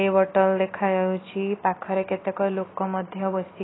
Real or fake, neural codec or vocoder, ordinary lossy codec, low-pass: real; none; AAC, 16 kbps; 7.2 kHz